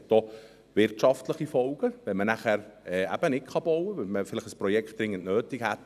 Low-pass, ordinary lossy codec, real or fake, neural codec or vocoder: 14.4 kHz; none; real; none